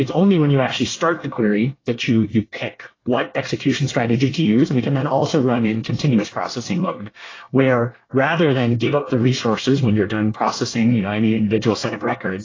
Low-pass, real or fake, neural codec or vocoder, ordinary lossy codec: 7.2 kHz; fake; codec, 24 kHz, 1 kbps, SNAC; AAC, 32 kbps